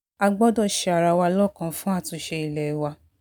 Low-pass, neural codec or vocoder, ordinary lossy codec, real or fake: none; none; none; real